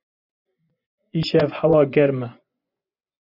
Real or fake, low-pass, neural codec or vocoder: fake; 5.4 kHz; vocoder, 24 kHz, 100 mel bands, Vocos